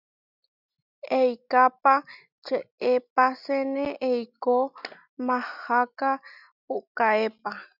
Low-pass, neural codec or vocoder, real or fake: 5.4 kHz; none; real